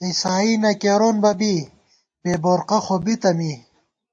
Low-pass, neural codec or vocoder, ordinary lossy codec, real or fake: 9.9 kHz; none; AAC, 64 kbps; real